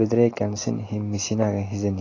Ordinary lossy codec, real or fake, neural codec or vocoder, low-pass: AAC, 32 kbps; real; none; 7.2 kHz